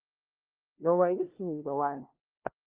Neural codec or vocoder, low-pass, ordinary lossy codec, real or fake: codec, 16 kHz, 0.5 kbps, FunCodec, trained on LibriTTS, 25 frames a second; 3.6 kHz; Opus, 32 kbps; fake